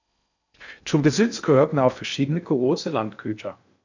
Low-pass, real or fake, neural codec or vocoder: 7.2 kHz; fake; codec, 16 kHz in and 24 kHz out, 0.6 kbps, FocalCodec, streaming, 2048 codes